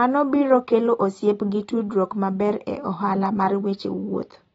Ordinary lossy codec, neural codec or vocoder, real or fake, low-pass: AAC, 24 kbps; none; real; 19.8 kHz